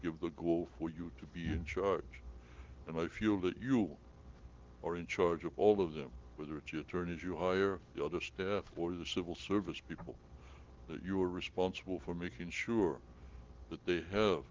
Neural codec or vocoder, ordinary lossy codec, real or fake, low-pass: none; Opus, 16 kbps; real; 7.2 kHz